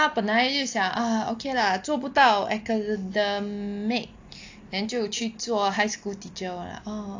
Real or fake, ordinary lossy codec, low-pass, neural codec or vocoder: real; AAC, 48 kbps; 7.2 kHz; none